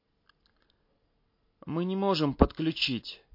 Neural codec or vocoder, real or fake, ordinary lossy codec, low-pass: none; real; MP3, 32 kbps; 5.4 kHz